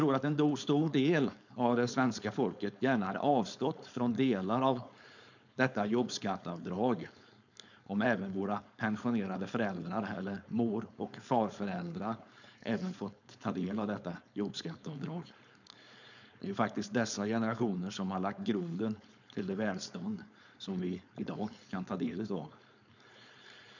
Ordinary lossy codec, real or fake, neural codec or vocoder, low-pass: none; fake; codec, 16 kHz, 4.8 kbps, FACodec; 7.2 kHz